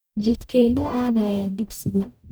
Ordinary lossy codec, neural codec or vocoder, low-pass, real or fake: none; codec, 44.1 kHz, 0.9 kbps, DAC; none; fake